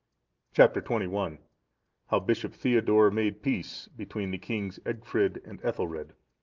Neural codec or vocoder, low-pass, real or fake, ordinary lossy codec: none; 7.2 kHz; real; Opus, 24 kbps